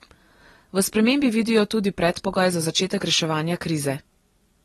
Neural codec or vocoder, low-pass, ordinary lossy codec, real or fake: none; 19.8 kHz; AAC, 32 kbps; real